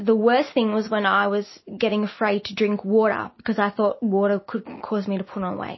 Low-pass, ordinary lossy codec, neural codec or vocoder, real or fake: 7.2 kHz; MP3, 24 kbps; none; real